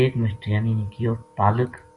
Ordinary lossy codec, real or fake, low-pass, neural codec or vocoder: Opus, 64 kbps; real; 10.8 kHz; none